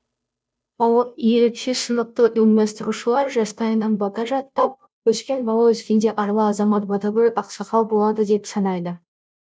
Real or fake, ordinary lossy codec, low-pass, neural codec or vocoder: fake; none; none; codec, 16 kHz, 0.5 kbps, FunCodec, trained on Chinese and English, 25 frames a second